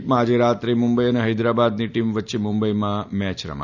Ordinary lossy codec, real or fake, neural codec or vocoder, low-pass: none; real; none; 7.2 kHz